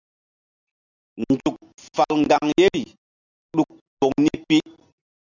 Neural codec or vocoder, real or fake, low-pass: none; real; 7.2 kHz